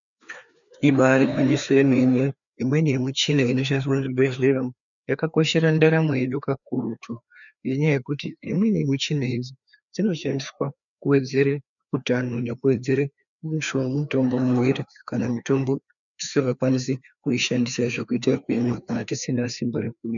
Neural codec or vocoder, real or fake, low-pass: codec, 16 kHz, 2 kbps, FreqCodec, larger model; fake; 7.2 kHz